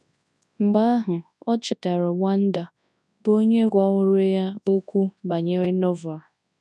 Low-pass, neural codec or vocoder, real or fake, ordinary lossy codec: none; codec, 24 kHz, 0.9 kbps, WavTokenizer, large speech release; fake; none